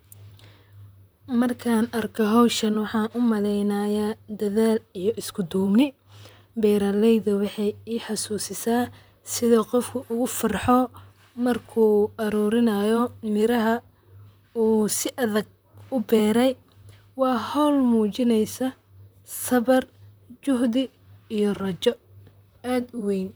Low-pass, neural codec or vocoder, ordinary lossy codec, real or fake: none; vocoder, 44.1 kHz, 128 mel bands, Pupu-Vocoder; none; fake